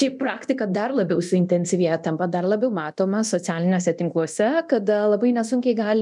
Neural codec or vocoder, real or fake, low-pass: codec, 24 kHz, 0.9 kbps, DualCodec; fake; 10.8 kHz